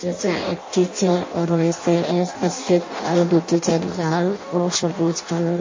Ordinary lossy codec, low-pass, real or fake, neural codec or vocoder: MP3, 32 kbps; 7.2 kHz; fake; codec, 16 kHz in and 24 kHz out, 0.6 kbps, FireRedTTS-2 codec